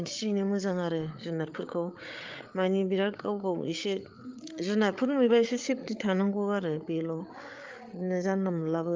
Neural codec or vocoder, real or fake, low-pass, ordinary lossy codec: codec, 16 kHz, 8 kbps, FreqCodec, larger model; fake; 7.2 kHz; Opus, 32 kbps